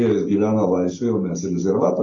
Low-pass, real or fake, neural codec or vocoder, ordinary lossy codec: 7.2 kHz; real; none; AAC, 32 kbps